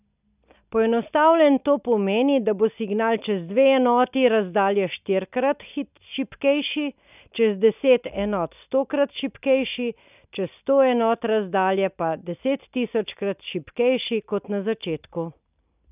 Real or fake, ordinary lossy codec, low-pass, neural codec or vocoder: real; none; 3.6 kHz; none